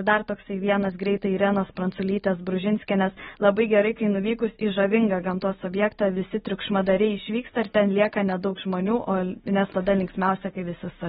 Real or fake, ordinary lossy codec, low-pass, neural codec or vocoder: real; AAC, 16 kbps; 19.8 kHz; none